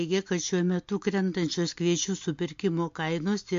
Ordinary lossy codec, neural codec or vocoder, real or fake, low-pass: MP3, 48 kbps; none; real; 7.2 kHz